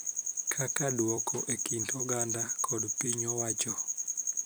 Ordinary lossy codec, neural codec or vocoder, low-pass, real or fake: none; vocoder, 44.1 kHz, 128 mel bands every 256 samples, BigVGAN v2; none; fake